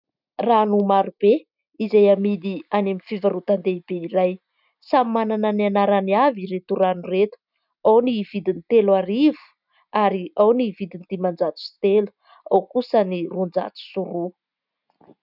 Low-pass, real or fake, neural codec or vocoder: 5.4 kHz; real; none